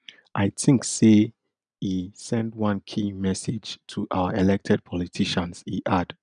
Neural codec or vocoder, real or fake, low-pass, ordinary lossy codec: none; real; 9.9 kHz; none